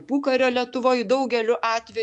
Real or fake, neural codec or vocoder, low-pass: fake; autoencoder, 48 kHz, 128 numbers a frame, DAC-VAE, trained on Japanese speech; 10.8 kHz